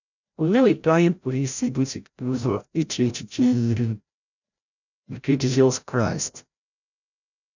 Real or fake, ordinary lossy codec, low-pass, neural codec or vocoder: fake; none; 7.2 kHz; codec, 16 kHz, 0.5 kbps, FreqCodec, larger model